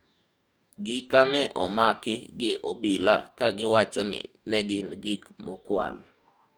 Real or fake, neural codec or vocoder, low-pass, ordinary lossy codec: fake; codec, 44.1 kHz, 2.6 kbps, DAC; none; none